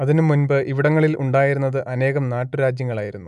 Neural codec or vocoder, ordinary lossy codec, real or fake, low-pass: none; none; real; 10.8 kHz